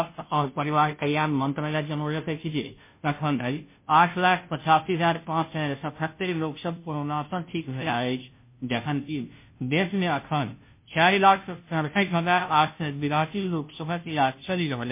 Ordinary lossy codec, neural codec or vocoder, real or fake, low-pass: MP3, 24 kbps; codec, 16 kHz, 0.5 kbps, FunCodec, trained on Chinese and English, 25 frames a second; fake; 3.6 kHz